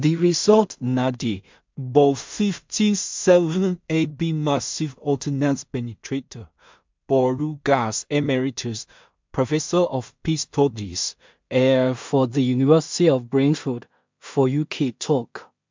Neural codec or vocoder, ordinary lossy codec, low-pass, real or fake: codec, 16 kHz in and 24 kHz out, 0.4 kbps, LongCat-Audio-Codec, two codebook decoder; MP3, 64 kbps; 7.2 kHz; fake